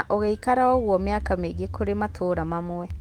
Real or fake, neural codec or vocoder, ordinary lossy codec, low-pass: fake; autoencoder, 48 kHz, 128 numbers a frame, DAC-VAE, trained on Japanese speech; Opus, 24 kbps; 19.8 kHz